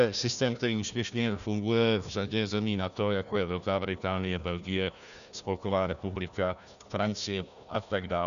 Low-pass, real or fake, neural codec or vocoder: 7.2 kHz; fake; codec, 16 kHz, 1 kbps, FunCodec, trained on Chinese and English, 50 frames a second